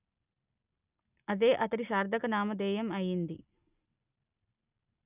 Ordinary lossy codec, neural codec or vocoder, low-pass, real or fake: none; none; 3.6 kHz; real